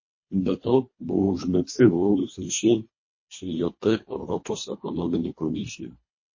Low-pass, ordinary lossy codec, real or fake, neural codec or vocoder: 7.2 kHz; MP3, 32 kbps; fake; codec, 24 kHz, 1.5 kbps, HILCodec